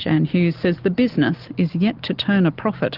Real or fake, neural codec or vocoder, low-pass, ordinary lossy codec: real; none; 5.4 kHz; Opus, 32 kbps